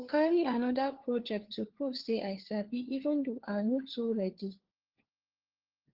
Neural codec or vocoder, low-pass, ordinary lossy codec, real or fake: codec, 16 kHz, 4 kbps, FunCodec, trained on LibriTTS, 50 frames a second; 5.4 kHz; Opus, 16 kbps; fake